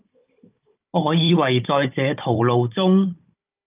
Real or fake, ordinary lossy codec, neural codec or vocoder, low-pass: fake; Opus, 24 kbps; codec, 16 kHz, 16 kbps, FunCodec, trained on Chinese and English, 50 frames a second; 3.6 kHz